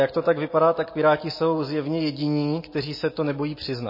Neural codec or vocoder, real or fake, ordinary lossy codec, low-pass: none; real; MP3, 24 kbps; 5.4 kHz